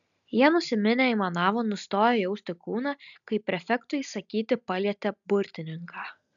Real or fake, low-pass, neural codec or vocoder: real; 7.2 kHz; none